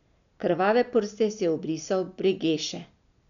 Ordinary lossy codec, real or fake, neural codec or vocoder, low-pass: none; real; none; 7.2 kHz